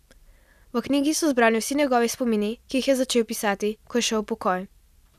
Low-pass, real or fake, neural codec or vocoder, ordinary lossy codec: 14.4 kHz; real; none; none